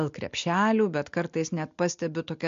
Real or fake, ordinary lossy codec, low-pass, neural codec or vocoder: real; MP3, 48 kbps; 7.2 kHz; none